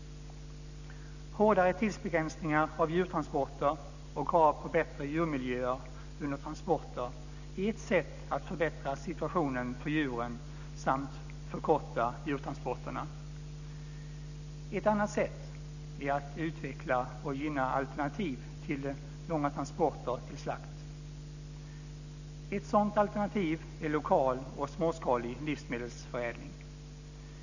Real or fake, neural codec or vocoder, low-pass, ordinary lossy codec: real; none; 7.2 kHz; none